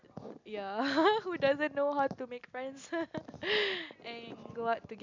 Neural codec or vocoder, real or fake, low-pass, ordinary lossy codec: none; real; 7.2 kHz; MP3, 64 kbps